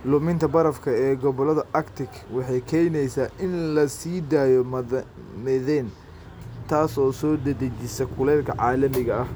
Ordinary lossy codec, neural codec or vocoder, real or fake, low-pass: none; none; real; none